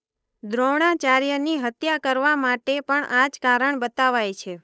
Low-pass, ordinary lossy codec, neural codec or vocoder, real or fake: none; none; codec, 16 kHz, 8 kbps, FunCodec, trained on Chinese and English, 25 frames a second; fake